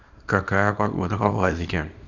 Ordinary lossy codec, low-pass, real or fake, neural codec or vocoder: none; 7.2 kHz; fake; codec, 24 kHz, 0.9 kbps, WavTokenizer, small release